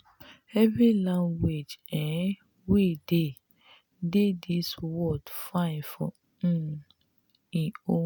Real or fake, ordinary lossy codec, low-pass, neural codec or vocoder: real; none; none; none